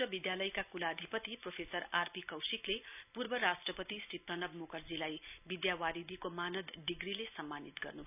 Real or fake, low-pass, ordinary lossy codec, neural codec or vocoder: real; 3.6 kHz; none; none